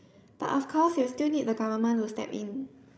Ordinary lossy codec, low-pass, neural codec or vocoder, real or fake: none; none; codec, 16 kHz, 16 kbps, FreqCodec, larger model; fake